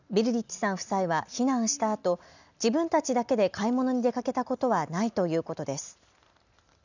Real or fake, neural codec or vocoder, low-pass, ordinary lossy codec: real; none; 7.2 kHz; none